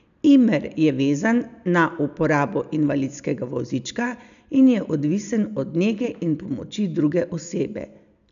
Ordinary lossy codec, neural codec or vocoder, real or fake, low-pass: none; none; real; 7.2 kHz